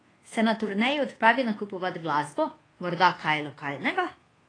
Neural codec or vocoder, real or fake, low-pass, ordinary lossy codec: codec, 24 kHz, 1.2 kbps, DualCodec; fake; 9.9 kHz; AAC, 32 kbps